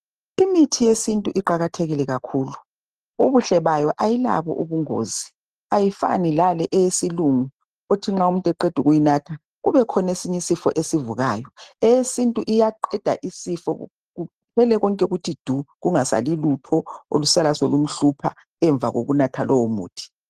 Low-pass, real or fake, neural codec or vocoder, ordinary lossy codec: 14.4 kHz; real; none; Opus, 16 kbps